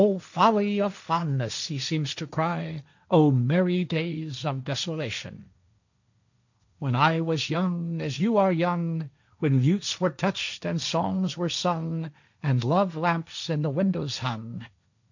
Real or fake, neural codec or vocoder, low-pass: fake; codec, 16 kHz, 1.1 kbps, Voila-Tokenizer; 7.2 kHz